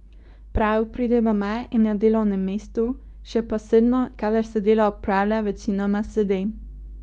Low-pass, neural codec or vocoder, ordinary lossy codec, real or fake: 10.8 kHz; codec, 24 kHz, 0.9 kbps, WavTokenizer, medium speech release version 2; none; fake